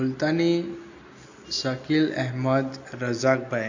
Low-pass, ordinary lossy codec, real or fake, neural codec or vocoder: 7.2 kHz; AAC, 48 kbps; real; none